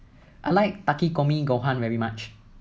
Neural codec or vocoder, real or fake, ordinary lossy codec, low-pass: none; real; none; none